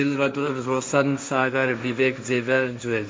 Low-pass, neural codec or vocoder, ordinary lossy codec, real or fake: none; codec, 16 kHz, 1.1 kbps, Voila-Tokenizer; none; fake